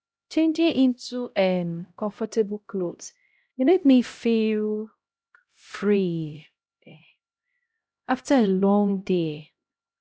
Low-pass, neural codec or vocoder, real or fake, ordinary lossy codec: none; codec, 16 kHz, 0.5 kbps, X-Codec, HuBERT features, trained on LibriSpeech; fake; none